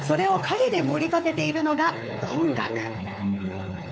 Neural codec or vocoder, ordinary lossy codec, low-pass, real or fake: codec, 16 kHz, 4 kbps, X-Codec, WavLM features, trained on Multilingual LibriSpeech; none; none; fake